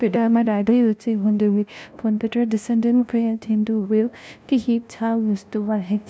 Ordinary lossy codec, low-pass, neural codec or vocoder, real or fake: none; none; codec, 16 kHz, 0.5 kbps, FunCodec, trained on LibriTTS, 25 frames a second; fake